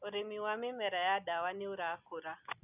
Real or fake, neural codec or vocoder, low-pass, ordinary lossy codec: real; none; 3.6 kHz; none